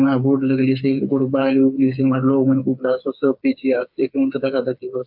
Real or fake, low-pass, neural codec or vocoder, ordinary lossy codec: fake; 5.4 kHz; codec, 16 kHz, 4 kbps, FreqCodec, smaller model; AAC, 48 kbps